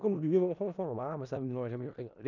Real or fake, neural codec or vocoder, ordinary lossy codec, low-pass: fake; codec, 16 kHz in and 24 kHz out, 0.4 kbps, LongCat-Audio-Codec, four codebook decoder; none; 7.2 kHz